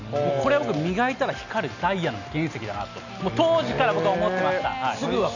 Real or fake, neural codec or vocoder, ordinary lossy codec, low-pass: real; none; none; 7.2 kHz